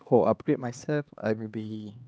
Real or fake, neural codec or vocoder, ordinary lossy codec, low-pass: fake; codec, 16 kHz, 2 kbps, X-Codec, HuBERT features, trained on LibriSpeech; none; none